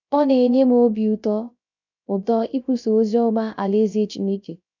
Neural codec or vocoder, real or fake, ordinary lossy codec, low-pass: codec, 16 kHz, 0.3 kbps, FocalCodec; fake; none; 7.2 kHz